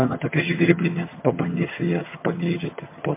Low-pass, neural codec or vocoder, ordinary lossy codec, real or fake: 3.6 kHz; vocoder, 22.05 kHz, 80 mel bands, HiFi-GAN; MP3, 24 kbps; fake